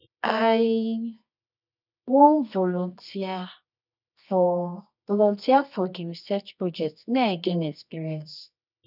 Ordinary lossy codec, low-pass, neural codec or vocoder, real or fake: none; 5.4 kHz; codec, 24 kHz, 0.9 kbps, WavTokenizer, medium music audio release; fake